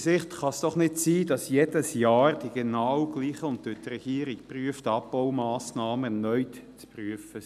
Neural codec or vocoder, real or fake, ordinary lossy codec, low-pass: none; real; none; 14.4 kHz